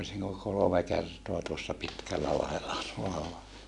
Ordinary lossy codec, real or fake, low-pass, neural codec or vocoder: none; real; 10.8 kHz; none